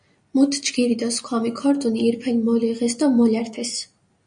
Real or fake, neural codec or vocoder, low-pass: real; none; 9.9 kHz